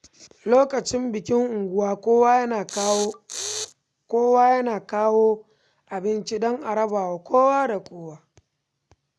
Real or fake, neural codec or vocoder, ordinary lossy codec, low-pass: real; none; none; none